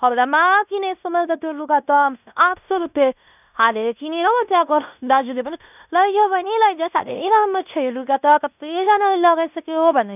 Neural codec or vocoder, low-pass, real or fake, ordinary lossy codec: codec, 16 kHz in and 24 kHz out, 0.9 kbps, LongCat-Audio-Codec, fine tuned four codebook decoder; 3.6 kHz; fake; none